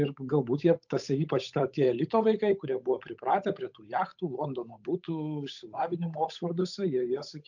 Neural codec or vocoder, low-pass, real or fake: codec, 16 kHz, 8 kbps, FunCodec, trained on Chinese and English, 25 frames a second; 7.2 kHz; fake